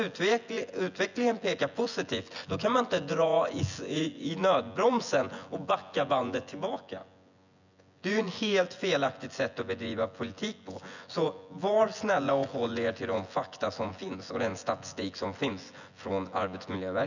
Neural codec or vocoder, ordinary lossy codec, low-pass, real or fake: vocoder, 24 kHz, 100 mel bands, Vocos; none; 7.2 kHz; fake